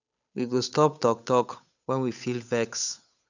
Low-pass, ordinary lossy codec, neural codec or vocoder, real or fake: 7.2 kHz; none; codec, 16 kHz, 8 kbps, FunCodec, trained on Chinese and English, 25 frames a second; fake